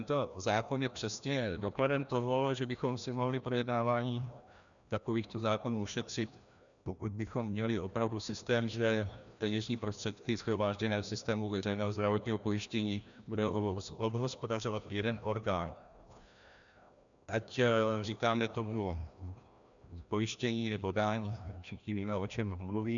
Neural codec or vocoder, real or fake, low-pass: codec, 16 kHz, 1 kbps, FreqCodec, larger model; fake; 7.2 kHz